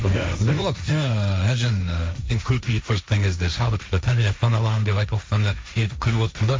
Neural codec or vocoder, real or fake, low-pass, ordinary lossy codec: codec, 16 kHz, 1.1 kbps, Voila-Tokenizer; fake; none; none